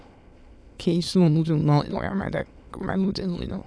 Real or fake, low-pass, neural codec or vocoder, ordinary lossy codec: fake; none; autoencoder, 22.05 kHz, a latent of 192 numbers a frame, VITS, trained on many speakers; none